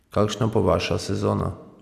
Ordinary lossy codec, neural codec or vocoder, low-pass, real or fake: Opus, 64 kbps; none; 14.4 kHz; real